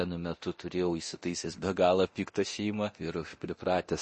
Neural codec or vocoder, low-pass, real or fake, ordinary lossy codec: codec, 24 kHz, 0.9 kbps, DualCodec; 10.8 kHz; fake; MP3, 32 kbps